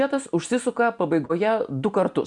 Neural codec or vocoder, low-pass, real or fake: none; 10.8 kHz; real